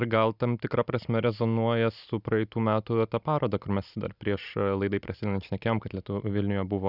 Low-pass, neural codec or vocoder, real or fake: 5.4 kHz; none; real